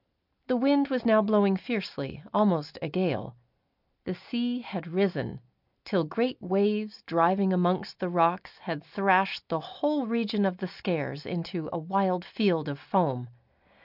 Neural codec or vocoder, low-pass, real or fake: none; 5.4 kHz; real